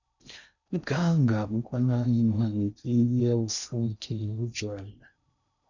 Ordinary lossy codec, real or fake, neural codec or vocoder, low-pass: none; fake; codec, 16 kHz in and 24 kHz out, 0.6 kbps, FocalCodec, streaming, 4096 codes; 7.2 kHz